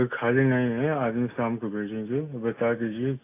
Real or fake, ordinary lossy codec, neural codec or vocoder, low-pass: real; AAC, 24 kbps; none; 3.6 kHz